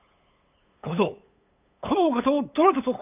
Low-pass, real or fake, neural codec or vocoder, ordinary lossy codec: 3.6 kHz; fake; codec, 16 kHz, 8 kbps, FunCodec, trained on LibriTTS, 25 frames a second; none